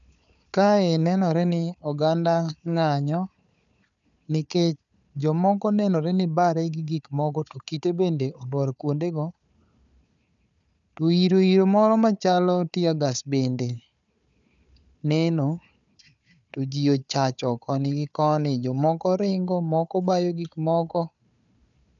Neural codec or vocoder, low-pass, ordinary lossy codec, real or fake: codec, 16 kHz, 4 kbps, FunCodec, trained on Chinese and English, 50 frames a second; 7.2 kHz; none; fake